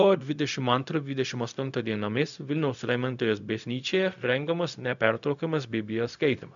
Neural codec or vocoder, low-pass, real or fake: codec, 16 kHz, 0.4 kbps, LongCat-Audio-Codec; 7.2 kHz; fake